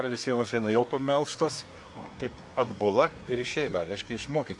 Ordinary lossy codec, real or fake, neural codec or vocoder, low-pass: AAC, 64 kbps; fake; codec, 24 kHz, 1 kbps, SNAC; 10.8 kHz